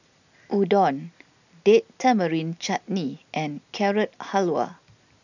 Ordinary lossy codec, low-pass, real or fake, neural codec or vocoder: none; 7.2 kHz; real; none